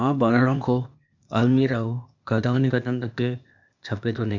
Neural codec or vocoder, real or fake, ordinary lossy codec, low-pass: codec, 16 kHz, 0.8 kbps, ZipCodec; fake; none; 7.2 kHz